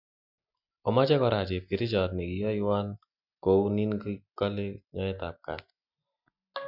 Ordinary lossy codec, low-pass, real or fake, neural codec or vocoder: none; 5.4 kHz; real; none